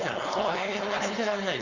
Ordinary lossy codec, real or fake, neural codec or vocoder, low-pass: none; fake; codec, 16 kHz, 4.8 kbps, FACodec; 7.2 kHz